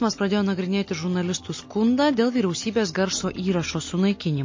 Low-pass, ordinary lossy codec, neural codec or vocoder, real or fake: 7.2 kHz; MP3, 32 kbps; none; real